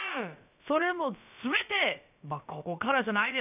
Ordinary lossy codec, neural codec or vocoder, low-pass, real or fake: none; codec, 16 kHz, about 1 kbps, DyCAST, with the encoder's durations; 3.6 kHz; fake